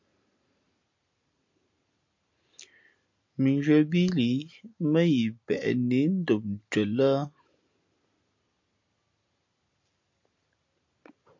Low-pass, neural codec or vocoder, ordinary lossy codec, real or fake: 7.2 kHz; none; MP3, 48 kbps; real